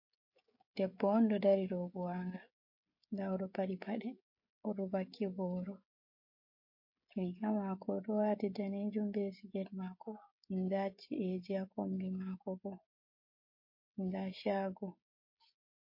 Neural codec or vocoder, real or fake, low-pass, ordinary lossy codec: codec, 16 kHz, 16 kbps, FreqCodec, smaller model; fake; 5.4 kHz; MP3, 32 kbps